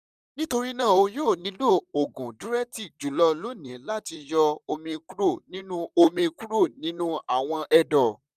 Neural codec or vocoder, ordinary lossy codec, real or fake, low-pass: vocoder, 44.1 kHz, 128 mel bands, Pupu-Vocoder; none; fake; 14.4 kHz